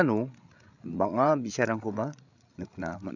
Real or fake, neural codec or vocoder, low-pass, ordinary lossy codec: fake; codec, 16 kHz, 8 kbps, FreqCodec, larger model; 7.2 kHz; none